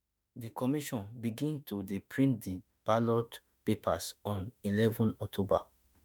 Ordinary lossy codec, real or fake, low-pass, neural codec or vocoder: none; fake; none; autoencoder, 48 kHz, 32 numbers a frame, DAC-VAE, trained on Japanese speech